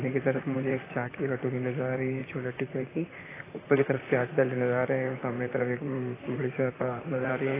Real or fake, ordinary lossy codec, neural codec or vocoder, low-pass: fake; AAC, 16 kbps; vocoder, 44.1 kHz, 128 mel bands, Pupu-Vocoder; 3.6 kHz